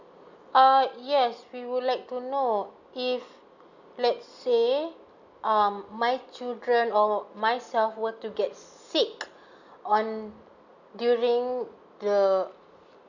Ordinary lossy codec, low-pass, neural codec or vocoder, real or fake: none; 7.2 kHz; none; real